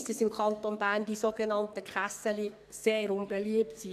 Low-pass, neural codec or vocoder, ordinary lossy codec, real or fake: 14.4 kHz; codec, 44.1 kHz, 2.6 kbps, SNAC; AAC, 96 kbps; fake